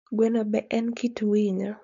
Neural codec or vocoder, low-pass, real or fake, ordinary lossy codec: codec, 16 kHz, 4.8 kbps, FACodec; 7.2 kHz; fake; none